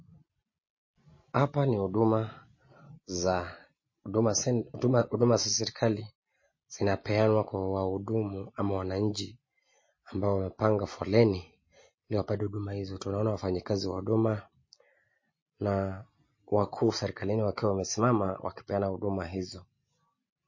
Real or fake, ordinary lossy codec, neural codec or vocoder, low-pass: real; MP3, 32 kbps; none; 7.2 kHz